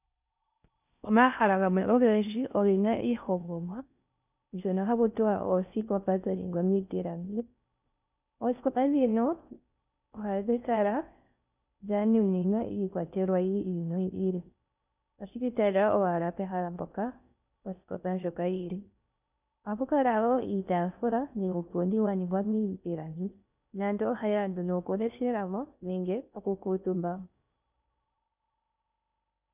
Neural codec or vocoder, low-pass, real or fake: codec, 16 kHz in and 24 kHz out, 0.6 kbps, FocalCodec, streaming, 4096 codes; 3.6 kHz; fake